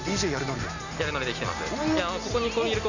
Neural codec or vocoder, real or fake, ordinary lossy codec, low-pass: none; real; none; 7.2 kHz